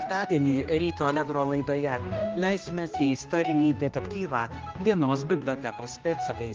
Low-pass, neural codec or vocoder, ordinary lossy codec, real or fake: 7.2 kHz; codec, 16 kHz, 1 kbps, X-Codec, HuBERT features, trained on general audio; Opus, 24 kbps; fake